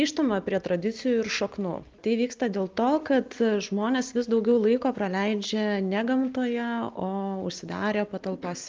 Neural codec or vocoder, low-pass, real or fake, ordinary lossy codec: none; 7.2 kHz; real; Opus, 32 kbps